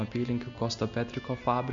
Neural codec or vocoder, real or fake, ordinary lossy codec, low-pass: none; real; MP3, 48 kbps; 7.2 kHz